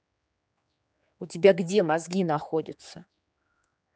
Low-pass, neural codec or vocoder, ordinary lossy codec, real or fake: none; codec, 16 kHz, 4 kbps, X-Codec, HuBERT features, trained on general audio; none; fake